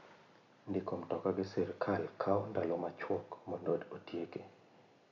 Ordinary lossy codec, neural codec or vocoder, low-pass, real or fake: MP3, 48 kbps; none; 7.2 kHz; real